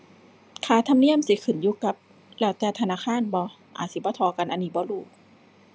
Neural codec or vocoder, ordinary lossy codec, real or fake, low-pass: none; none; real; none